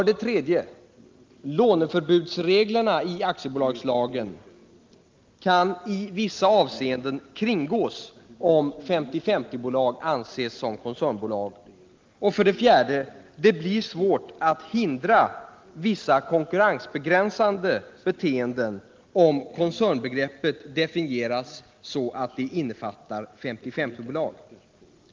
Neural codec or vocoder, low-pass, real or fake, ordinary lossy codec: none; 7.2 kHz; real; Opus, 32 kbps